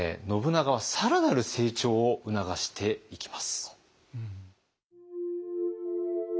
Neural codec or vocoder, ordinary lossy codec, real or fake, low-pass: none; none; real; none